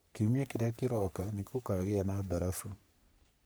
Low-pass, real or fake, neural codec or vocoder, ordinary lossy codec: none; fake; codec, 44.1 kHz, 3.4 kbps, Pupu-Codec; none